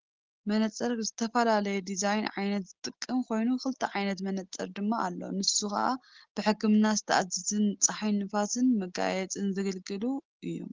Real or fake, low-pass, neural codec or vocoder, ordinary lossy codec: real; 7.2 kHz; none; Opus, 16 kbps